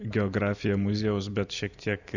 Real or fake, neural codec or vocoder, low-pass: real; none; 7.2 kHz